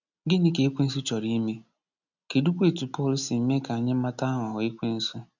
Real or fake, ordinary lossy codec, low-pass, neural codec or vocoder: real; none; 7.2 kHz; none